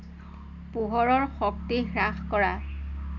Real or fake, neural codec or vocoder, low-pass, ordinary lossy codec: real; none; 7.2 kHz; none